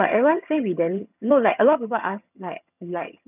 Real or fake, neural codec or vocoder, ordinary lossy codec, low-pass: fake; vocoder, 22.05 kHz, 80 mel bands, HiFi-GAN; none; 3.6 kHz